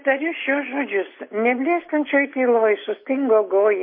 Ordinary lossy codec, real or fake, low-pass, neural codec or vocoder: MP3, 24 kbps; real; 5.4 kHz; none